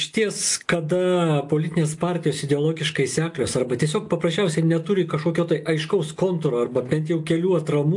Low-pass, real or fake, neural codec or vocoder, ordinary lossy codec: 10.8 kHz; real; none; AAC, 64 kbps